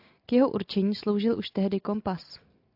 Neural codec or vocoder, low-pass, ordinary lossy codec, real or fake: none; 5.4 kHz; AAC, 48 kbps; real